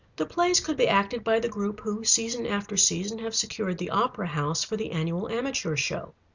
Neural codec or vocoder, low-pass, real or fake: none; 7.2 kHz; real